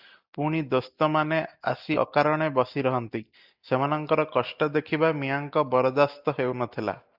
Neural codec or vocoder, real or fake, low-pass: none; real; 5.4 kHz